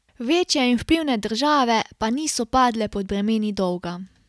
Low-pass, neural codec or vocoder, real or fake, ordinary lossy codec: none; none; real; none